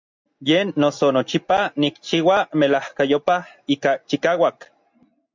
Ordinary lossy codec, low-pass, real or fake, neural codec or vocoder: MP3, 64 kbps; 7.2 kHz; real; none